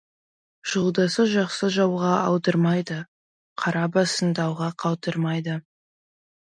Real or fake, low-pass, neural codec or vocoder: real; 9.9 kHz; none